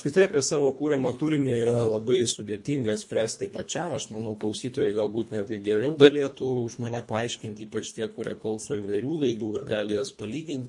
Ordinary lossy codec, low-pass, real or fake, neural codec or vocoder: MP3, 48 kbps; 10.8 kHz; fake; codec, 24 kHz, 1.5 kbps, HILCodec